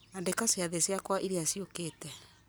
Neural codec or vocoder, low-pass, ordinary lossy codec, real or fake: none; none; none; real